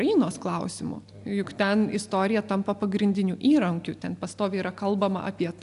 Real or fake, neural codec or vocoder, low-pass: real; none; 10.8 kHz